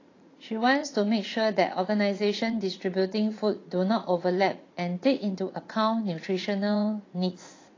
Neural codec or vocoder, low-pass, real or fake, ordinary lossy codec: vocoder, 44.1 kHz, 80 mel bands, Vocos; 7.2 kHz; fake; AAC, 32 kbps